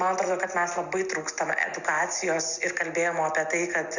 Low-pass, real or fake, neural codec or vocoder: 7.2 kHz; real; none